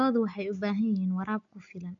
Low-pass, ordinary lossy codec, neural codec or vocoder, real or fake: 7.2 kHz; AAC, 48 kbps; none; real